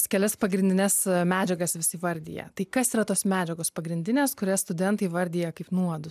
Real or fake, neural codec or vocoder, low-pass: real; none; 14.4 kHz